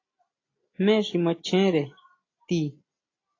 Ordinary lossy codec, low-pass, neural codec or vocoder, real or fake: AAC, 32 kbps; 7.2 kHz; none; real